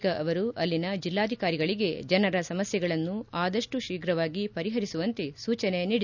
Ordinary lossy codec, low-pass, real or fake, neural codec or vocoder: none; 7.2 kHz; real; none